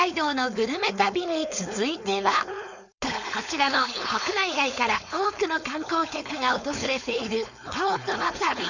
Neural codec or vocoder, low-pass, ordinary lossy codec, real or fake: codec, 16 kHz, 4.8 kbps, FACodec; 7.2 kHz; none; fake